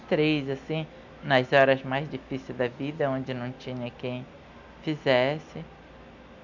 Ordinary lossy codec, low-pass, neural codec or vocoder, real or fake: none; 7.2 kHz; none; real